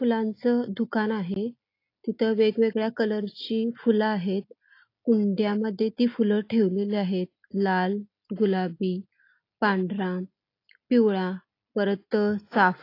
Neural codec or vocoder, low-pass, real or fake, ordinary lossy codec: none; 5.4 kHz; real; AAC, 32 kbps